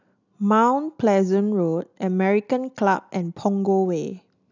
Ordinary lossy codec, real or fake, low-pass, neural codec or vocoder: none; real; 7.2 kHz; none